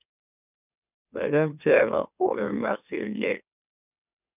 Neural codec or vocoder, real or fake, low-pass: autoencoder, 44.1 kHz, a latent of 192 numbers a frame, MeloTTS; fake; 3.6 kHz